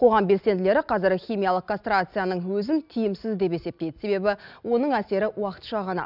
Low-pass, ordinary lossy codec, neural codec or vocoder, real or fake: 5.4 kHz; none; none; real